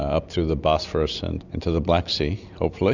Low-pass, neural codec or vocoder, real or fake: 7.2 kHz; none; real